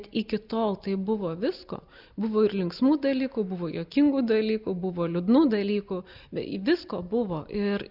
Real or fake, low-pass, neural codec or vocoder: real; 5.4 kHz; none